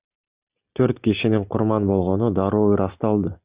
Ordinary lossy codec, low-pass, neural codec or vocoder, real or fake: Opus, 64 kbps; 3.6 kHz; none; real